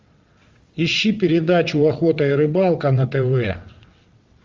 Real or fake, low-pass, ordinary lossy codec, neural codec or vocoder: fake; 7.2 kHz; Opus, 32 kbps; vocoder, 22.05 kHz, 80 mel bands, WaveNeXt